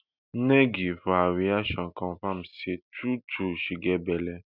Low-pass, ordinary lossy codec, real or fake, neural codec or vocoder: 5.4 kHz; none; real; none